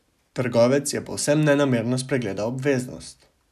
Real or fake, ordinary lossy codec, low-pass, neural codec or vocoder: real; none; 14.4 kHz; none